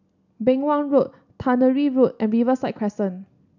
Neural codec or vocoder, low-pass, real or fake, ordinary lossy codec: none; 7.2 kHz; real; none